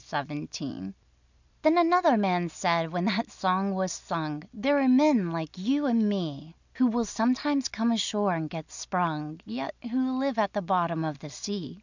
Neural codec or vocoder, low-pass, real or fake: none; 7.2 kHz; real